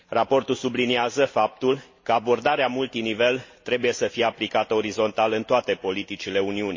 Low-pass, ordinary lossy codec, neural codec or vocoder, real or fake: 7.2 kHz; MP3, 32 kbps; none; real